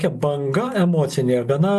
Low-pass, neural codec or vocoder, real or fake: 14.4 kHz; none; real